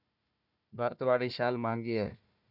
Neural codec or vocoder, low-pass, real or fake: codec, 16 kHz, 1 kbps, FunCodec, trained on Chinese and English, 50 frames a second; 5.4 kHz; fake